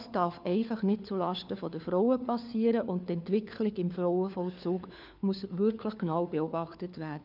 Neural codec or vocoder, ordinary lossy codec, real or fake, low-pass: codec, 16 kHz, 4 kbps, FunCodec, trained on LibriTTS, 50 frames a second; none; fake; 5.4 kHz